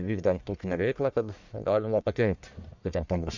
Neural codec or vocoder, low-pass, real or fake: codec, 44.1 kHz, 1.7 kbps, Pupu-Codec; 7.2 kHz; fake